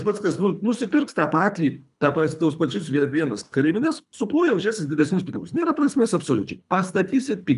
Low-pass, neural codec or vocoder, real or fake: 10.8 kHz; codec, 24 kHz, 3 kbps, HILCodec; fake